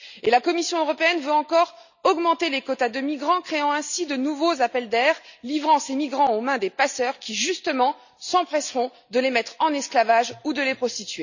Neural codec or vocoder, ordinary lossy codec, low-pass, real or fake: none; none; 7.2 kHz; real